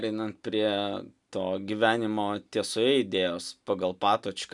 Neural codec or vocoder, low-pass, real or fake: none; 10.8 kHz; real